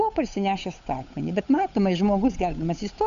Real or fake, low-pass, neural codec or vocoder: fake; 7.2 kHz; codec, 16 kHz, 8 kbps, FunCodec, trained on Chinese and English, 25 frames a second